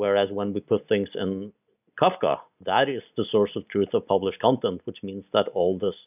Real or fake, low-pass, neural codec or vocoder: real; 3.6 kHz; none